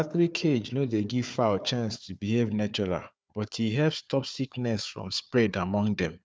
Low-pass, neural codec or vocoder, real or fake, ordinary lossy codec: none; codec, 16 kHz, 4 kbps, FunCodec, trained on Chinese and English, 50 frames a second; fake; none